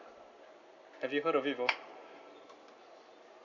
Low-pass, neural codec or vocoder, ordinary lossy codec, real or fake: 7.2 kHz; none; none; real